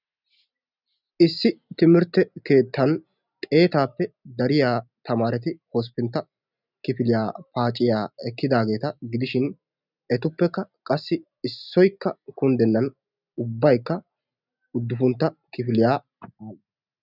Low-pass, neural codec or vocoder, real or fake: 5.4 kHz; none; real